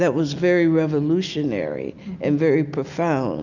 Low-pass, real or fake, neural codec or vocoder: 7.2 kHz; real; none